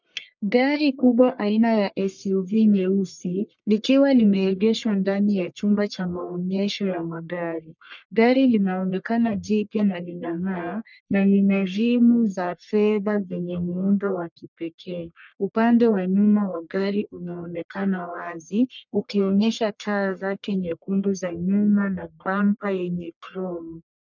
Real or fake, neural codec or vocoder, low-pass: fake; codec, 44.1 kHz, 1.7 kbps, Pupu-Codec; 7.2 kHz